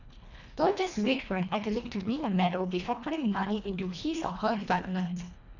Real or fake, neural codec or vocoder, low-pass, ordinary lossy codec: fake; codec, 24 kHz, 1.5 kbps, HILCodec; 7.2 kHz; none